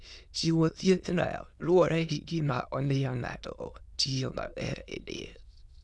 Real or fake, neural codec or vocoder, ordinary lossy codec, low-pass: fake; autoencoder, 22.05 kHz, a latent of 192 numbers a frame, VITS, trained on many speakers; none; none